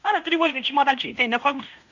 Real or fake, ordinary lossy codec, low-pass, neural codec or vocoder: fake; none; 7.2 kHz; codec, 16 kHz in and 24 kHz out, 0.9 kbps, LongCat-Audio-Codec, fine tuned four codebook decoder